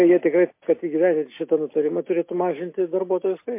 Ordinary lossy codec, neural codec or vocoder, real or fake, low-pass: MP3, 24 kbps; none; real; 3.6 kHz